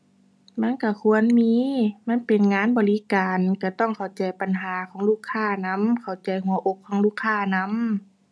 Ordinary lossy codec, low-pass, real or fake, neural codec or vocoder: none; none; real; none